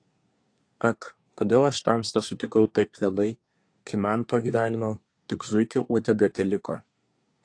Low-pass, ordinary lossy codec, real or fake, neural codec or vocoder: 9.9 kHz; AAC, 48 kbps; fake; codec, 24 kHz, 1 kbps, SNAC